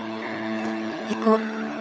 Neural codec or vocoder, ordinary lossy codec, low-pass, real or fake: codec, 16 kHz, 16 kbps, FunCodec, trained on LibriTTS, 50 frames a second; none; none; fake